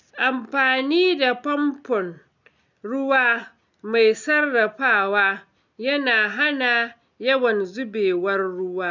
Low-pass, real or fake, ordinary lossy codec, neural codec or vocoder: 7.2 kHz; real; none; none